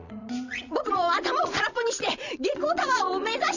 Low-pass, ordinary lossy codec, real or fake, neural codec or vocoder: 7.2 kHz; none; fake; vocoder, 22.05 kHz, 80 mel bands, Vocos